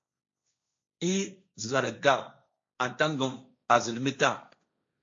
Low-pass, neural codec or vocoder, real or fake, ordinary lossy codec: 7.2 kHz; codec, 16 kHz, 1.1 kbps, Voila-Tokenizer; fake; AAC, 48 kbps